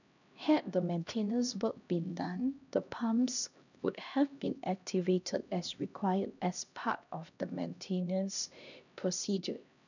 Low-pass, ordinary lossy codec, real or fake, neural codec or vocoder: 7.2 kHz; none; fake; codec, 16 kHz, 1 kbps, X-Codec, HuBERT features, trained on LibriSpeech